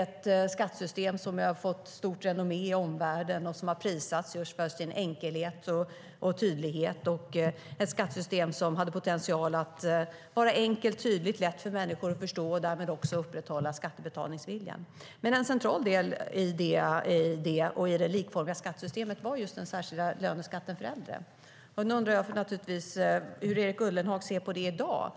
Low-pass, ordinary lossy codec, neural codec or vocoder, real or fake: none; none; none; real